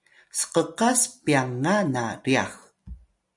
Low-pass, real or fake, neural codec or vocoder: 10.8 kHz; real; none